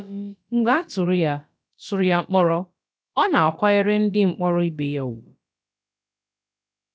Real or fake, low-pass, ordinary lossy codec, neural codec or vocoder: fake; none; none; codec, 16 kHz, about 1 kbps, DyCAST, with the encoder's durations